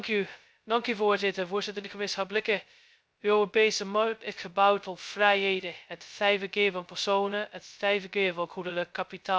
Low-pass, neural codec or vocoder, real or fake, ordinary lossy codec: none; codec, 16 kHz, 0.2 kbps, FocalCodec; fake; none